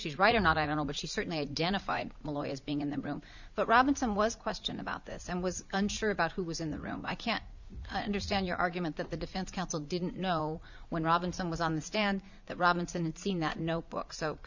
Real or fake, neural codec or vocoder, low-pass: fake; vocoder, 44.1 kHz, 80 mel bands, Vocos; 7.2 kHz